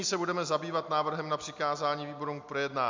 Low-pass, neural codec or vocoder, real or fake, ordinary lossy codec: 7.2 kHz; none; real; MP3, 64 kbps